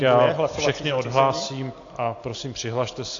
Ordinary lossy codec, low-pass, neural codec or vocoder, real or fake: AAC, 48 kbps; 7.2 kHz; none; real